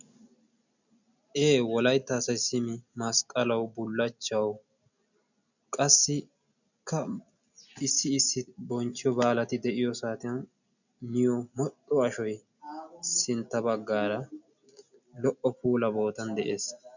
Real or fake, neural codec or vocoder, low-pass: real; none; 7.2 kHz